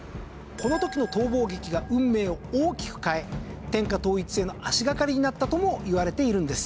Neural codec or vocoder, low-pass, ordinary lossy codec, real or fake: none; none; none; real